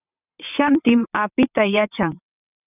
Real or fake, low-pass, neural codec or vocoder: fake; 3.6 kHz; vocoder, 22.05 kHz, 80 mel bands, WaveNeXt